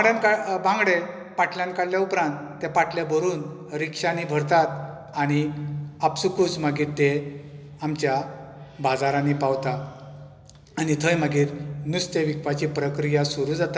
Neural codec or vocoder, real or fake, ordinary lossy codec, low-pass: none; real; none; none